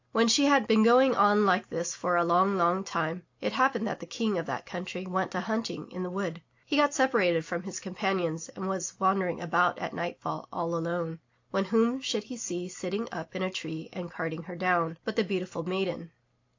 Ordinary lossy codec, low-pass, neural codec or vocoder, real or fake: AAC, 48 kbps; 7.2 kHz; none; real